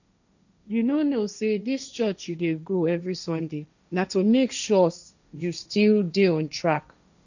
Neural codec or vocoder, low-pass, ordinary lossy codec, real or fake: codec, 16 kHz, 1.1 kbps, Voila-Tokenizer; 7.2 kHz; none; fake